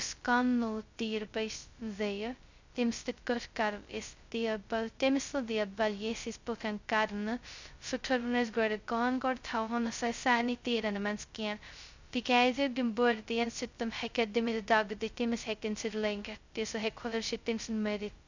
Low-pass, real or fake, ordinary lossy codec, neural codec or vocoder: 7.2 kHz; fake; Opus, 64 kbps; codec, 16 kHz, 0.2 kbps, FocalCodec